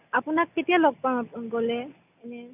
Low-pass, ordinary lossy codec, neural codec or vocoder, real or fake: 3.6 kHz; none; none; real